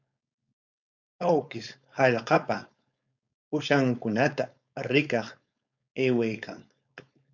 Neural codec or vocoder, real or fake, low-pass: codec, 16 kHz, 4.8 kbps, FACodec; fake; 7.2 kHz